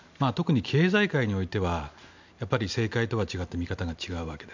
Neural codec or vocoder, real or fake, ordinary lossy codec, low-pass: none; real; MP3, 64 kbps; 7.2 kHz